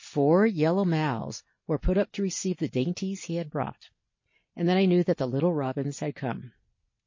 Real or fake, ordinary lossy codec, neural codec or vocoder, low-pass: real; MP3, 32 kbps; none; 7.2 kHz